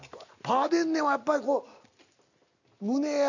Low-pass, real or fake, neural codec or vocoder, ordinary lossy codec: 7.2 kHz; real; none; none